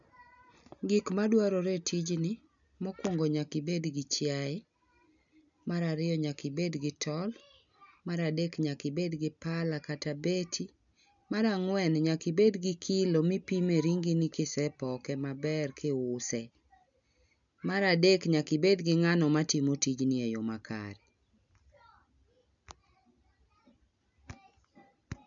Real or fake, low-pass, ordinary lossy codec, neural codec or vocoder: real; 7.2 kHz; none; none